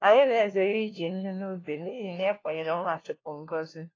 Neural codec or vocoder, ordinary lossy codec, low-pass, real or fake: codec, 16 kHz, 1 kbps, FunCodec, trained on LibriTTS, 50 frames a second; AAC, 32 kbps; 7.2 kHz; fake